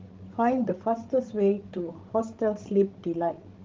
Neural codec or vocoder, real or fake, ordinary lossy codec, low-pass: codec, 16 kHz, 16 kbps, FreqCodec, larger model; fake; Opus, 16 kbps; 7.2 kHz